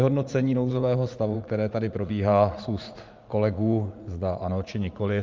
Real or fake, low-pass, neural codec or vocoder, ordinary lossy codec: fake; 7.2 kHz; vocoder, 44.1 kHz, 80 mel bands, Vocos; Opus, 24 kbps